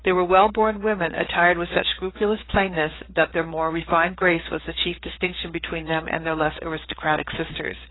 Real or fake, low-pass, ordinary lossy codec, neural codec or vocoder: fake; 7.2 kHz; AAC, 16 kbps; codec, 16 kHz, 4 kbps, FreqCodec, larger model